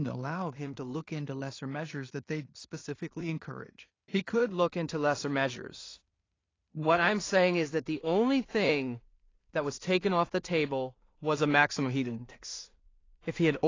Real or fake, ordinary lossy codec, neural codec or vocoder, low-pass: fake; AAC, 32 kbps; codec, 16 kHz in and 24 kHz out, 0.4 kbps, LongCat-Audio-Codec, two codebook decoder; 7.2 kHz